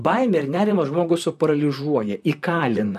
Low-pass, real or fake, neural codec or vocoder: 14.4 kHz; fake; vocoder, 44.1 kHz, 128 mel bands, Pupu-Vocoder